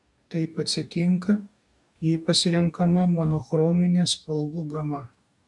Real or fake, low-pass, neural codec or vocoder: fake; 10.8 kHz; codec, 44.1 kHz, 2.6 kbps, DAC